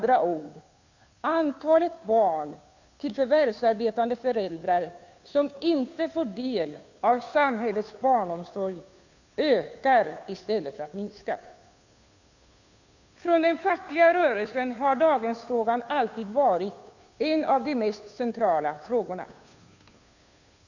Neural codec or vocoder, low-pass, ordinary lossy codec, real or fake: codec, 16 kHz, 2 kbps, FunCodec, trained on Chinese and English, 25 frames a second; 7.2 kHz; none; fake